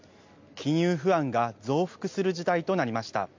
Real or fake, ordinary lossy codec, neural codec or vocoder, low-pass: real; MP3, 64 kbps; none; 7.2 kHz